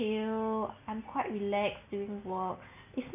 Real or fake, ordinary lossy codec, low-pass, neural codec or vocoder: real; none; 3.6 kHz; none